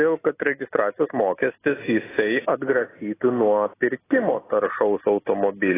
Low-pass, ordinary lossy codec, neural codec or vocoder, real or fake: 3.6 kHz; AAC, 16 kbps; none; real